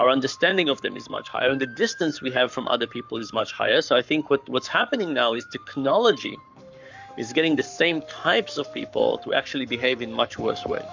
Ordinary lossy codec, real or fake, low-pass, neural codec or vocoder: MP3, 64 kbps; fake; 7.2 kHz; codec, 44.1 kHz, 7.8 kbps, Pupu-Codec